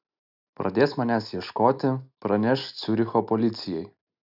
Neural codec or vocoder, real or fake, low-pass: none; real; 5.4 kHz